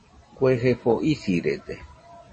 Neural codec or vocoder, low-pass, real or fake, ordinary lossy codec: none; 9.9 kHz; real; MP3, 32 kbps